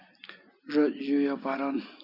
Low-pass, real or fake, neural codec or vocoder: 5.4 kHz; real; none